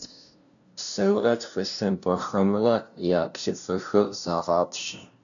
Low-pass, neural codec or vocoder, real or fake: 7.2 kHz; codec, 16 kHz, 0.5 kbps, FunCodec, trained on LibriTTS, 25 frames a second; fake